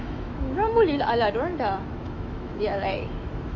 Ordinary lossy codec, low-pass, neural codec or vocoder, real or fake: MP3, 48 kbps; 7.2 kHz; autoencoder, 48 kHz, 128 numbers a frame, DAC-VAE, trained on Japanese speech; fake